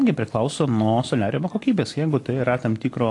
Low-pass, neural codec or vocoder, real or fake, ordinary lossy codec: 10.8 kHz; none; real; AAC, 48 kbps